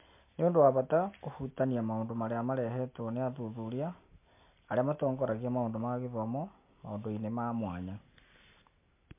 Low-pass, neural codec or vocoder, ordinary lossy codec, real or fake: 3.6 kHz; none; MP3, 24 kbps; real